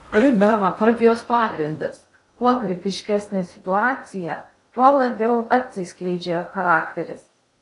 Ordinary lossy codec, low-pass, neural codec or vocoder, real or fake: AAC, 48 kbps; 10.8 kHz; codec, 16 kHz in and 24 kHz out, 0.6 kbps, FocalCodec, streaming, 2048 codes; fake